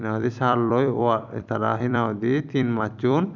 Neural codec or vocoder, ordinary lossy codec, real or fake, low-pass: vocoder, 44.1 kHz, 128 mel bands every 256 samples, BigVGAN v2; none; fake; 7.2 kHz